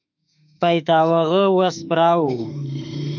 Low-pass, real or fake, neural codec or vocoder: 7.2 kHz; fake; autoencoder, 48 kHz, 32 numbers a frame, DAC-VAE, trained on Japanese speech